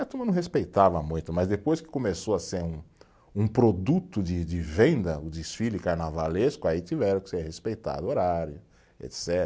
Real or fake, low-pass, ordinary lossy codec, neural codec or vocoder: real; none; none; none